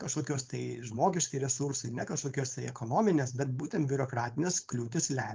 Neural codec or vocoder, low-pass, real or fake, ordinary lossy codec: codec, 16 kHz, 4.8 kbps, FACodec; 7.2 kHz; fake; Opus, 24 kbps